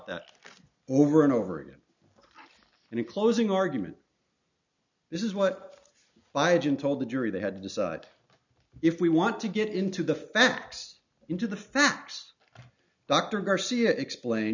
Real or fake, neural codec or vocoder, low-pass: real; none; 7.2 kHz